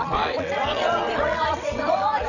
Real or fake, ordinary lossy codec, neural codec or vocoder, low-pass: fake; none; vocoder, 22.05 kHz, 80 mel bands, WaveNeXt; 7.2 kHz